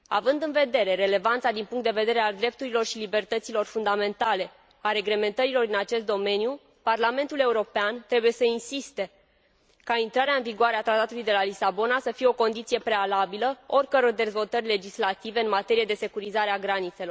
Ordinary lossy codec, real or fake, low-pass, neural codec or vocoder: none; real; none; none